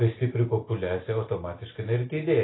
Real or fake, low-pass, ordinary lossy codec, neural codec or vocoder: real; 7.2 kHz; AAC, 16 kbps; none